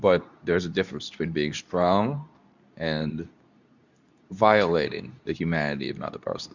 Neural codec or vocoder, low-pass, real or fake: codec, 24 kHz, 0.9 kbps, WavTokenizer, medium speech release version 2; 7.2 kHz; fake